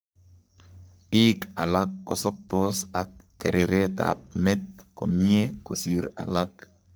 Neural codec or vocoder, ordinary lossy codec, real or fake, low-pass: codec, 44.1 kHz, 3.4 kbps, Pupu-Codec; none; fake; none